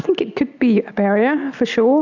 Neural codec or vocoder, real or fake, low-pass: none; real; 7.2 kHz